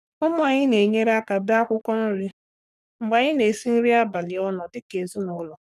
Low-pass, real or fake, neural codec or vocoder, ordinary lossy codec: 14.4 kHz; fake; codec, 44.1 kHz, 3.4 kbps, Pupu-Codec; none